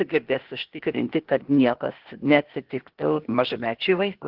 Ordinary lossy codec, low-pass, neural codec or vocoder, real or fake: Opus, 16 kbps; 5.4 kHz; codec, 16 kHz, 0.8 kbps, ZipCodec; fake